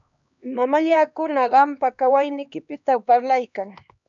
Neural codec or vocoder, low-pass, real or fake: codec, 16 kHz, 2 kbps, X-Codec, HuBERT features, trained on LibriSpeech; 7.2 kHz; fake